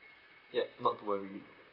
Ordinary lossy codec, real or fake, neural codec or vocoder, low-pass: none; fake; vocoder, 44.1 kHz, 128 mel bands, Pupu-Vocoder; 5.4 kHz